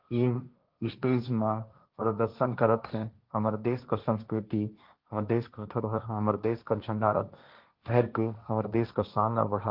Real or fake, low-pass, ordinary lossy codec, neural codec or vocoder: fake; 5.4 kHz; Opus, 24 kbps; codec, 16 kHz, 1.1 kbps, Voila-Tokenizer